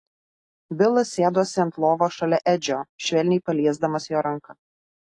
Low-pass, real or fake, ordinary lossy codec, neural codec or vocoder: 10.8 kHz; real; AAC, 48 kbps; none